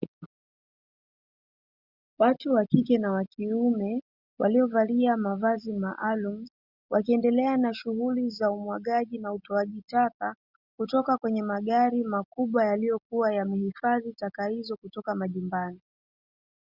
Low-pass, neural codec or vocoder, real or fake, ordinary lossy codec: 5.4 kHz; none; real; Opus, 64 kbps